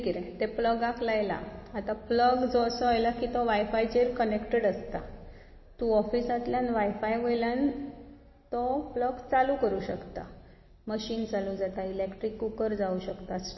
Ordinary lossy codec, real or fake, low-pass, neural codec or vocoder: MP3, 24 kbps; real; 7.2 kHz; none